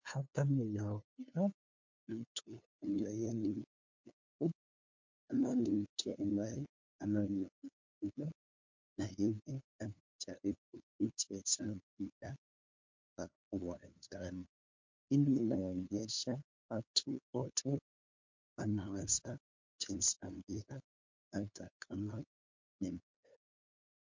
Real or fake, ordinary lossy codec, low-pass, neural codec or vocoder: fake; MP3, 48 kbps; 7.2 kHz; codec, 16 kHz, 2 kbps, FunCodec, trained on LibriTTS, 25 frames a second